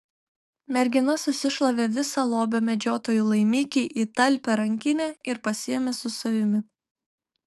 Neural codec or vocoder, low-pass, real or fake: codec, 44.1 kHz, 7.8 kbps, DAC; 14.4 kHz; fake